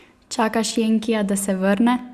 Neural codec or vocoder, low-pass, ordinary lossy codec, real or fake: none; 14.4 kHz; Opus, 64 kbps; real